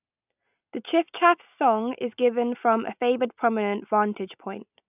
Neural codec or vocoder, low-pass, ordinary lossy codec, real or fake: none; 3.6 kHz; none; real